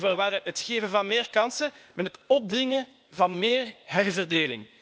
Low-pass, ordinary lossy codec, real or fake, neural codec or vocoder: none; none; fake; codec, 16 kHz, 0.8 kbps, ZipCodec